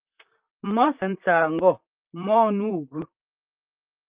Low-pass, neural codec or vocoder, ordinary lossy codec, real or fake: 3.6 kHz; vocoder, 44.1 kHz, 128 mel bands, Pupu-Vocoder; Opus, 32 kbps; fake